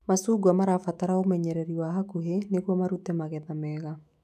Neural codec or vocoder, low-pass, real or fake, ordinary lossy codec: autoencoder, 48 kHz, 128 numbers a frame, DAC-VAE, trained on Japanese speech; 14.4 kHz; fake; none